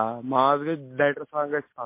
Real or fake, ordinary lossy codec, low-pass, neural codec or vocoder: real; MP3, 24 kbps; 3.6 kHz; none